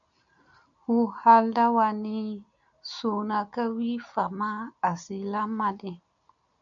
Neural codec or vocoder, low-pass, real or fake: none; 7.2 kHz; real